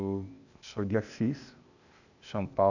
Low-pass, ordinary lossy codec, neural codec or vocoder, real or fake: 7.2 kHz; none; codec, 16 kHz, 0.8 kbps, ZipCodec; fake